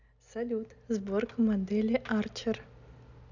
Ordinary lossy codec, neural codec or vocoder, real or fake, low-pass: none; none; real; 7.2 kHz